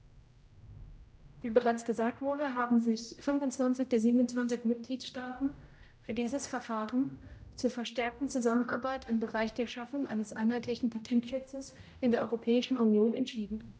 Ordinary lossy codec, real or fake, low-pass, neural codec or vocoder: none; fake; none; codec, 16 kHz, 0.5 kbps, X-Codec, HuBERT features, trained on general audio